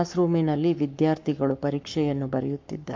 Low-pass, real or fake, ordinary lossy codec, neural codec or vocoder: 7.2 kHz; fake; MP3, 48 kbps; codec, 44.1 kHz, 7.8 kbps, Pupu-Codec